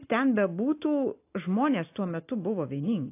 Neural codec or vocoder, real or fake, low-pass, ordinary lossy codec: none; real; 3.6 kHz; AAC, 24 kbps